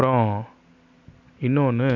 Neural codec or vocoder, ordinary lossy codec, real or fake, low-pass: none; MP3, 64 kbps; real; 7.2 kHz